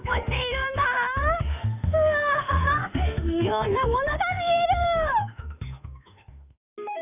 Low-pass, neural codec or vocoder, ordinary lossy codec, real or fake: 3.6 kHz; autoencoder, 48 kHz, 32 numbers a frame, DAC-VAE, trained on Japanese speech; none; fake